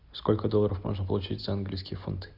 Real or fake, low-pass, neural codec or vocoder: real; 5.4 kHz; none